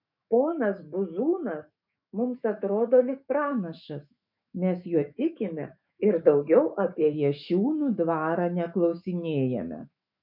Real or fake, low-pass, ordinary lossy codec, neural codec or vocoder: fake; 5.4 kHz; MP3, 48 kbps; autoencoder, 48 kHz, 128 numbers a frame, DAC-VAE, trained on Japanese speech